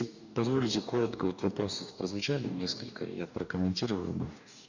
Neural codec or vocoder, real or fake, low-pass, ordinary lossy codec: codec, 44.1 kHz, 2.6 kbps, DAC; fake; 7.2 kHz; none